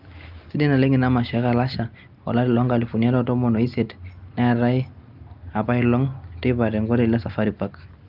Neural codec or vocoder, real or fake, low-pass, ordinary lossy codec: none; real; 5.4 kHz; Opus, 32 kbps